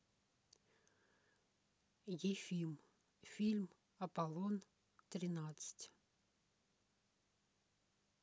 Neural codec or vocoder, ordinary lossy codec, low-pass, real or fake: none; none; none; real